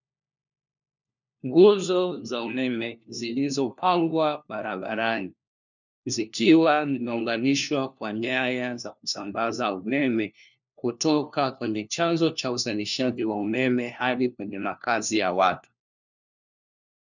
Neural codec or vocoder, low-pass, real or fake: codec, 16 kHz, 1 kbps, FunCodec, trained on LibriTTS, 50 frames a second; 7.2 kHz; fake